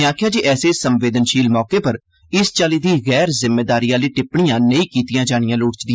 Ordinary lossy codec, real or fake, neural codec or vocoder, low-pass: none; real; none; none